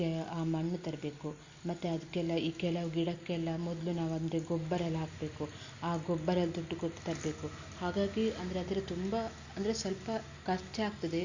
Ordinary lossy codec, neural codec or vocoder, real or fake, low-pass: Opus, 64 kbps; none; real; 7.2 kHz